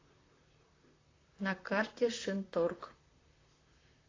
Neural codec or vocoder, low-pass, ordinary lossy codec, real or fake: vocoder, 44.1 kHz, 128 mel bands, Pupu-Vocoder; 7.2 kHz; AAC, 32 kbps; fake